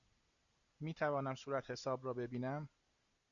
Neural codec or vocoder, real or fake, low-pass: none; real; 7.2 kHz